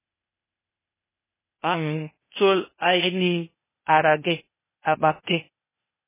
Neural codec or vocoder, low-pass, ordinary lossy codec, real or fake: codec, 16 kHz, 0.8 kbps, ZipCodec; 3.6 kHz; MP3, 16 kbps; fake